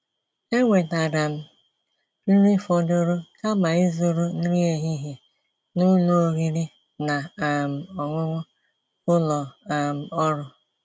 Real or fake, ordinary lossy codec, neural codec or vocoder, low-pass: real; none; none; none